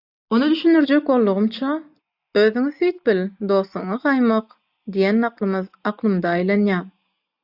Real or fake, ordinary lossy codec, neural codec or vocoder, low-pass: real; AAC, 48 kbps; none; 5.4 kHz